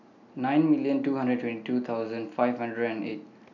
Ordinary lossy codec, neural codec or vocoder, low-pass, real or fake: none; none; 7.2 kHz; real